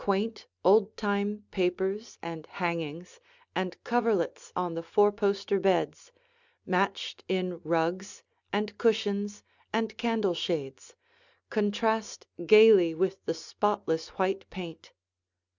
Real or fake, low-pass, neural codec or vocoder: real; 7.2 kHz; none